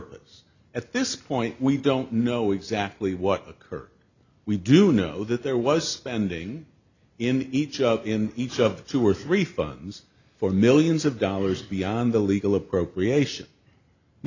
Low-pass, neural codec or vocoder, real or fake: 7.2 kHz; none; real